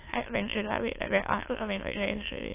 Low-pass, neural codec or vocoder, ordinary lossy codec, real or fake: 3.6 kHz; autoencoder, 22.05 kHz, a latent of 192 numbers a frame, VITS, trained on many speakers; AAC, 24 kbps; fake